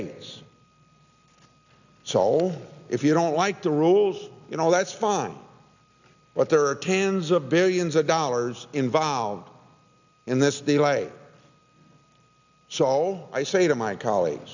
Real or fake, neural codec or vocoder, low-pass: real; none; 7.2 kHz